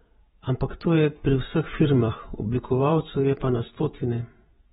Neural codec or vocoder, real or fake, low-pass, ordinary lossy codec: vocoder, 48 kHz, 128 mel bands, Vocos; fake; 19.8 kHz; AAC, 16 kbps